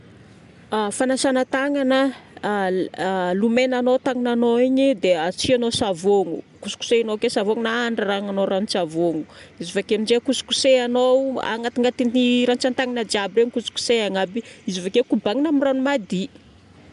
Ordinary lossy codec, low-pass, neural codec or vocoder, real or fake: none; 10.8 kHz; none; real